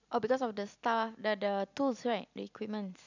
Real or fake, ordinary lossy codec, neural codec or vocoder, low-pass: real; none; none; 7.2 kHz